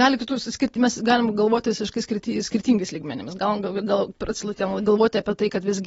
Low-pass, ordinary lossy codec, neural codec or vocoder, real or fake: 19.8 kHz; AAC, 24 kbps; none; real